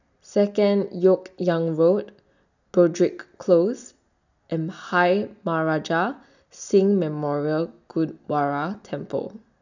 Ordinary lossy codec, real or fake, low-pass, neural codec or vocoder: none; real; 7.2 kHz; none